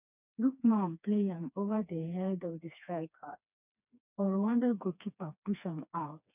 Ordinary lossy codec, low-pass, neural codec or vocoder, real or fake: none; 3.6 kHz; codec, 16 kHz, 2 kbps, FreqCodec, smaller model; fake